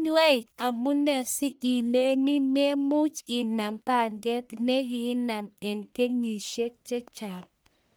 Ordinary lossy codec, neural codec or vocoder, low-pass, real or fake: none; codec, 44.1 kHz, 1.7 kbps, Pupu-Codec; none; fake